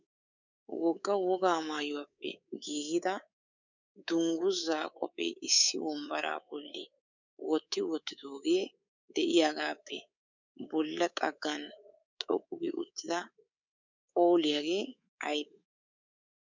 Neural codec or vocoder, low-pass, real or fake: codec, 24 kHz, 3.1 kbps, DualCodec; 7.2 kHz; fake